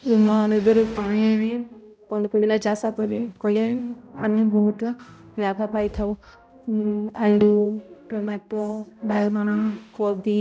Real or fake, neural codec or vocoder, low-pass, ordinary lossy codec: fake; codec, 16 kHz, 0.5 kbps, X-Codec, HuBERT features, trained on balanced general audio; none; none